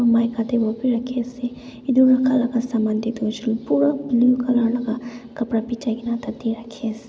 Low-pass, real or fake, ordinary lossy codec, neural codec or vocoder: none; real; none; none